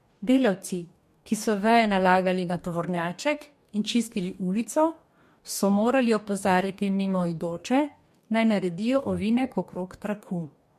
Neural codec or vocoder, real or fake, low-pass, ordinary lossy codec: codec, 44.1 kHz, 2.6 kbps, DAC; fake; 14.4 kHz; MP3, 64 kbps